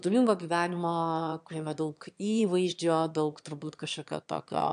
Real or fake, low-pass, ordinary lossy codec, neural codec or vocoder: fake; 9.9 kHz; AAC, 96 kbps; autoencoder, 22.05 kHz, a latent of 192 numbers a frame, VITS, trained on one speaker